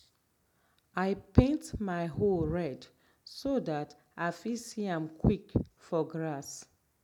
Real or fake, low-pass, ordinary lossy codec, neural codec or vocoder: real; 19.8 kHz; none; none